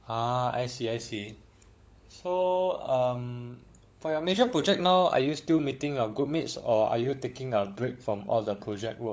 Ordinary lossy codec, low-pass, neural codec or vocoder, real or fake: none; none; codec, 16 kHz, 16 kbps, FunCodec, trained on LibriTTS, 50 frames a second; fake